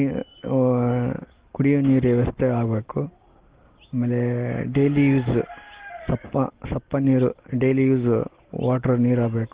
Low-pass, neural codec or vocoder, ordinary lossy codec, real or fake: 3.6 kHz; none; Opus, 16 kbps; real